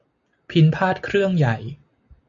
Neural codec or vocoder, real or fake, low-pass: none; real; 7.2 kHz